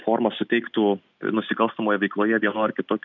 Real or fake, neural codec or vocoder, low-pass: real; none; 7.2 kHz